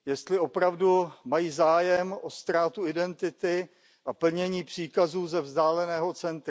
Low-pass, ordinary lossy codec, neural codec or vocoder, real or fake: none; none; none; real